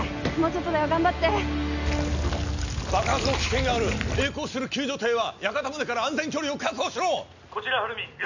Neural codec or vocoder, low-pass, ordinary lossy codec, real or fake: none; 7.2 kHz; none; real